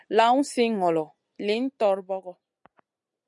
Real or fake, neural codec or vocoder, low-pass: real; none; 10.8 kHz